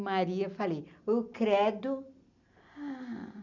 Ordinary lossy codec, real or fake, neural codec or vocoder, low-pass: none; real; none; 7.2 kHz